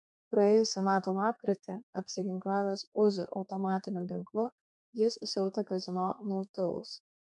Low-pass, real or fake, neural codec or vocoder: 10.8 kHz; fake; codec, 32 kHz, 1.9 kbps, SNAC